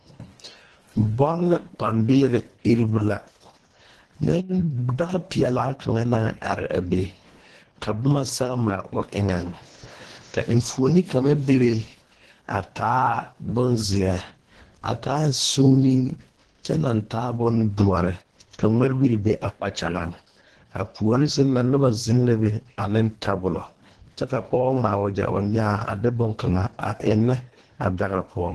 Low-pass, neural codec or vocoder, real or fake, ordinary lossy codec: 10.8 kHz; codec, 24 kHz, 1.5 kbps, HILCodec; fake; Opus, 16 kbps